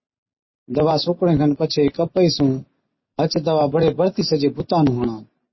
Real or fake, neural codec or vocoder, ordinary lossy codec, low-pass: real; none; MP3, 24 kbps; 7.2 kHz